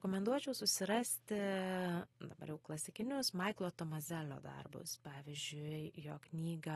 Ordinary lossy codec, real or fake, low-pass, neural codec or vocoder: AAC, 32 kbps; real; 19.8 kHz; none